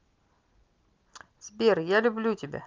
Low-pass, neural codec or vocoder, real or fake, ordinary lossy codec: 7.2 kHz; none; real; Opus, 24 kbps